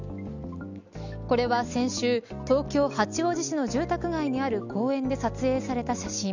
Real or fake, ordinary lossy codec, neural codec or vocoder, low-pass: real; none; none; 7.2 kHz